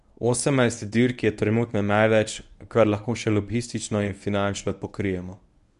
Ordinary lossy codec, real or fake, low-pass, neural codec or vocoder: none; fake; 10.8 kHz; codec, 24 kHz, 0.9 kbps, WavTokenizer, medium speech release version 1